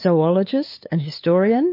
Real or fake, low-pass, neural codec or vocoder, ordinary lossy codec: fake; 5.4 kHz; codec, 16 kHz, 8 kbps, FunCodec, trained on LibriTTS, 25 frames a second; MP3, 32 kbps